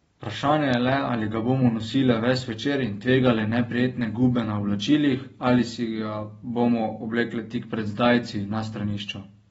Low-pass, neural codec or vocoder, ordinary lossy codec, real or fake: 10.8 kHz; none; AAC, 24 kbps; real